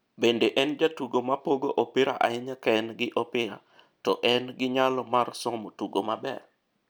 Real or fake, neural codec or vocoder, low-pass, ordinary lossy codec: real; none; none; none